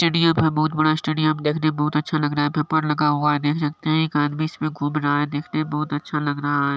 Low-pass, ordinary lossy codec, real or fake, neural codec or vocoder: none; none; real; none